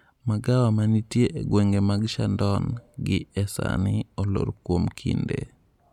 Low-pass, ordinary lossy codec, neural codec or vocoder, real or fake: 19.8 kHz; none; none; real